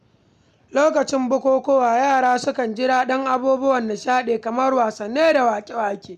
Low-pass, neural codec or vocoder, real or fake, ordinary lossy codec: 14.4 kHz; none; real; none